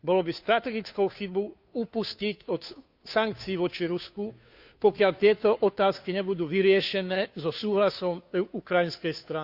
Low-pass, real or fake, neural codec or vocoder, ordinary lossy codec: 5.4 kHz; fake; codec, 16 kHz, 2 kbps, FunCodec, trained on Chinese and English, 25 frames a second; Opus, 64 kbps